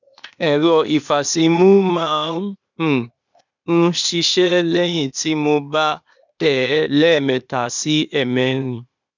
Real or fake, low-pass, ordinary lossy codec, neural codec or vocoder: fake; 7.2 kHz; none; codec, 16 kHz, 0.8 kbps, ZipCodec